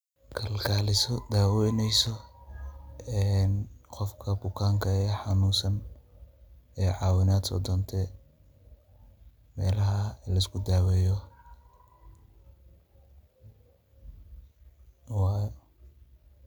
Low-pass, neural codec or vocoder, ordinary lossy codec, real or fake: none; none; none; real